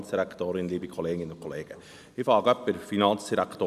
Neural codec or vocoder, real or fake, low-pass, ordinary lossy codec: none; real; 14.4 kHz; none